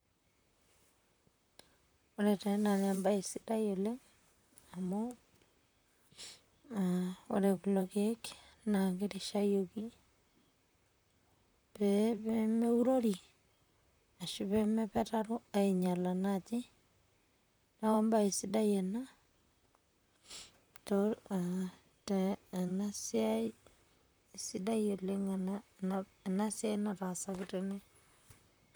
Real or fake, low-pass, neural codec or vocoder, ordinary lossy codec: fake; none; vocoder, 44.1 kHz, 128 mel bands, Pupu-Vocoder; none